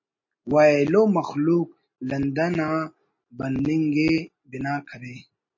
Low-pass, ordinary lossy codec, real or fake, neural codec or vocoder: 7.2 kHz; MP3, 32 kbps; real; none